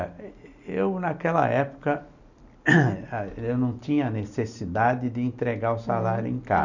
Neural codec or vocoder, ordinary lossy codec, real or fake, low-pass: none; none; real; 7.2 kHz